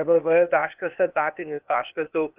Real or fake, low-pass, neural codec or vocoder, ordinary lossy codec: fake; 3.6 kHz; codec, 16 kHz, 0.8 kbps, ZipCodec; Opus, 24 kbps